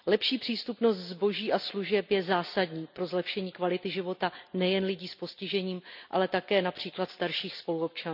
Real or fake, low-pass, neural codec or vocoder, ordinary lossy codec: real; 5.4 kHz; none; none